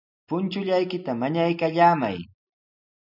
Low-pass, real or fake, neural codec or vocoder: 5.4 kHz; real; none